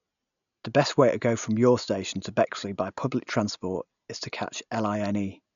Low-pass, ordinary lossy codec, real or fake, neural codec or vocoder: 7.2 kHz; none; real; none